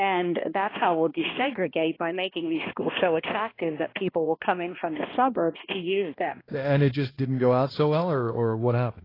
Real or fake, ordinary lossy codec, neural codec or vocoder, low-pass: fake; AAC, 24 kbps; codec, 16 kHz, 1 kbps, X-Codec, HuBERT features, trained on balanced general audio; 5.4 kHz